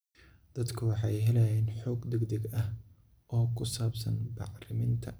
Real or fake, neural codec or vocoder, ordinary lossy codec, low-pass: real; none; none; none